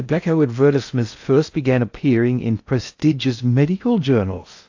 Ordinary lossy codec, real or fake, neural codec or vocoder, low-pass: AAC, 48 kbps; fake; codec, 16 kHz in and 24 kHz out, 0.6 kbps, FocalCodec, streaming, 4096 codes; 7.2 kHz